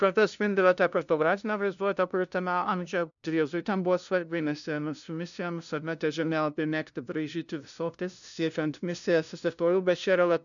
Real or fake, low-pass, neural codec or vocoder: fake; 7.2 kHz; codec, 16 kHz, 0.5 kbps, FunCodec, trained on LibriTTS, 25 frames a second